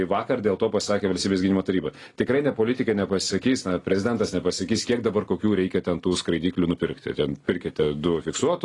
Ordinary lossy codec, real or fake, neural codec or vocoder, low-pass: AAC, 32 kbps; real; none; 10.8 kHz